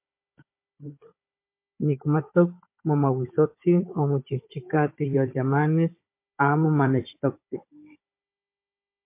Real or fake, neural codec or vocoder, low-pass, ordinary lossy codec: fake; codec, 16 kHz, 16 kbps, FunCodec, trained on Chinese and English, 50 frames a second; 3.6 kHz; MP3, 24 kbps